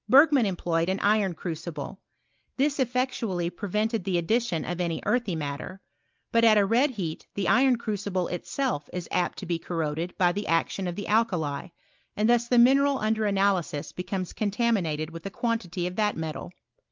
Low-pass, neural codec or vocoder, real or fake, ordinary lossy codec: 7.2 kHz; none; real; Opus, 32 kbps